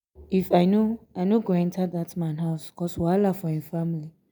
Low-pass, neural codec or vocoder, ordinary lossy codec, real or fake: none; none; none; real